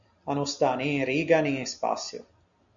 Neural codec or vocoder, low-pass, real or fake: none; 7.2 kHz; real